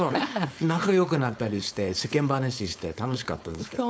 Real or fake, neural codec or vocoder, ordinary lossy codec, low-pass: fake; codec, 16 kHz, 4.8 kbps, FACodec; none; none